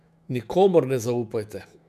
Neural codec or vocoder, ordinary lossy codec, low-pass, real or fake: autoencoder, 48 kHz, 128 numbers a frame, DAC-VAE, trained on Japanese speech; none; 14.4 kHz; fake